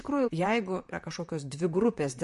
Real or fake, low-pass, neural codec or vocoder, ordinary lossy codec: fake; 14.4 kHz; vocoder, 44.1 kHz, 128 mel bands, Pupu-Vocoder; MP3, 48 kbps